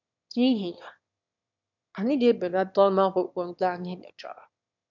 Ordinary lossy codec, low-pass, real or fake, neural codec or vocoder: none; 7.2 kHz; fake; autoencoder, 22.05 kHz, a latent of 192 numbers a frame, VITS, trained on one speaker